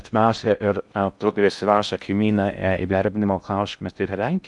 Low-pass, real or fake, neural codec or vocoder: 10.8 kHz; fake; codec, 16 kHz in and 24 kHz out, 0.6 kbps, FocalCodec, streaming, 4096 codes